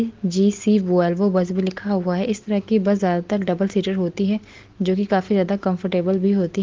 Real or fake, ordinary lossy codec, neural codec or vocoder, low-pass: real; Opus, 16 kbps; none; 7.2 kHz